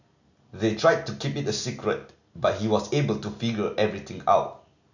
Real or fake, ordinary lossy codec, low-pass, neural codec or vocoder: real; none; 7.2 kHz; none